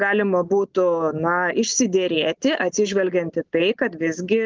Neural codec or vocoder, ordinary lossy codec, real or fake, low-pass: none; Opus, 24 kbps; real; 7.2 kHz